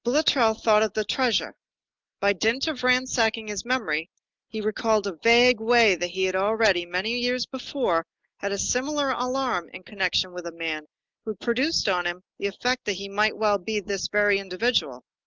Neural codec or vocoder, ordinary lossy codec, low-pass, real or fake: none; Opus, 24 kbps; 7.2 kHz; real